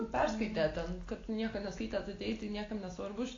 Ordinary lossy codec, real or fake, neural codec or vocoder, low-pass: AAC, 32 kbps; real; none; 7.2 kHz